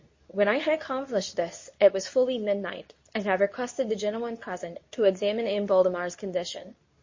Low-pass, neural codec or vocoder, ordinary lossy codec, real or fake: 7.2 kHz; codec, 24 kHz, 0.9 kbps, WavTokenizer, medium speech release version 2; MP3, 32 kbps; fake